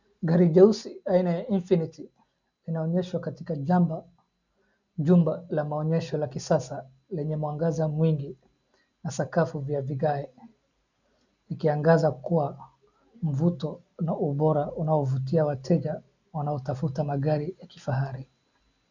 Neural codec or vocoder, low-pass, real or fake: none; 7.2 kHz; real